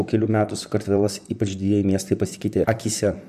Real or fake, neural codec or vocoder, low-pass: fake; vocoder, 44.1 kHz, 128 mel bands, Pupu-Vocoder; 14.4 kHz